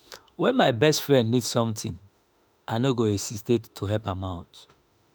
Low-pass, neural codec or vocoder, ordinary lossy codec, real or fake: none; autoencoder, 48 kHz, 32 numbers a frame, DAC-VAE, trained on Japanese speech; none; fake